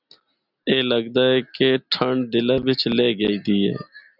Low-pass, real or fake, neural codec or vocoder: 5.4 kHz; real; none